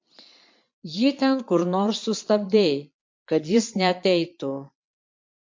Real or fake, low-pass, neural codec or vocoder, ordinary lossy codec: fake; 7.2 kHz; vocoder, 22.05 kHz, 80 mel bands, Vocos; MP3, 48 kbps